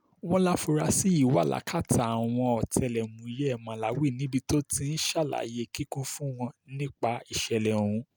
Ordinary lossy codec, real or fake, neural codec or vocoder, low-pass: none; real; none; none